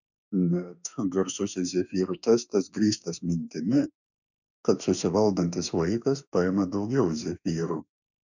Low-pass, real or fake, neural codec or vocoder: 7.2 kHz; fake; autoencoder, 48 kHz, 32 numbers a frame, DAC-VAE, trained on Japanese speech